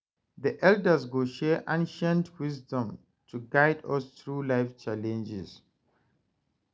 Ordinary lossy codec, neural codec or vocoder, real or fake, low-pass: none; none; real; none